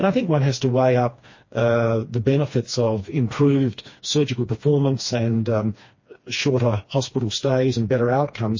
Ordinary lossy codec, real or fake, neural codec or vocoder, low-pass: MP3, 32 kbps; fake; codec, 16 kHz, 2 kbps, FreqCodec, smaller model; 7.2 kHz